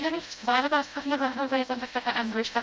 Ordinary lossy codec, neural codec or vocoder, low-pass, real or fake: none; codec, 16 kHz, 0.5 kbps, FreqCodec, smaller model; none; fake